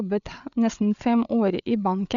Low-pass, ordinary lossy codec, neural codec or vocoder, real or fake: 7.2 kHz; none; codec, 16 kHz, 8 kbps, FreqCodec, larger model; fake